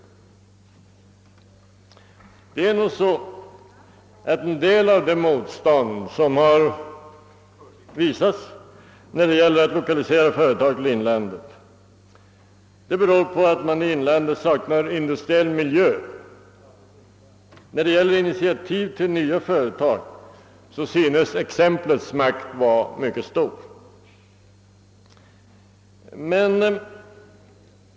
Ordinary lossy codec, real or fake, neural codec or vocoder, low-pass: none; real; none; none